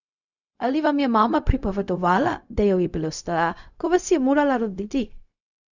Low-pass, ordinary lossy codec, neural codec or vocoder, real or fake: 7.2 kHz; none; codec, 16 kHz, 0.4 kbps, LongCat-Audio-Codec; fake